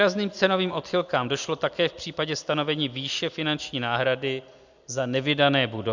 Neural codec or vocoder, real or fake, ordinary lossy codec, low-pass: none; real; Opus, 64 kbps; 7.2 kHz